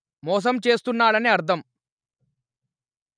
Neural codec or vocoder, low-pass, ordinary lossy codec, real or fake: none; 9.9 kHz; none; real